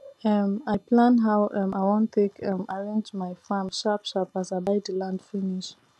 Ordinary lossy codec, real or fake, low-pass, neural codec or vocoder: none; real; none; none